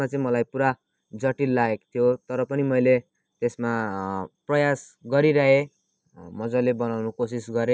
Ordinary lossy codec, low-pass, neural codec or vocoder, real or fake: none; none; none; real